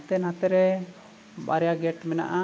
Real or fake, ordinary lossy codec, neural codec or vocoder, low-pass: real; none; none; none